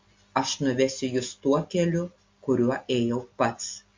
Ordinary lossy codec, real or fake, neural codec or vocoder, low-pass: MP3, 48 kbps; real; none; 7.2 kHz